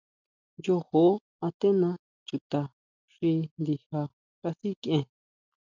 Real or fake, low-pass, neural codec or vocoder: real; 7.2 kHz; none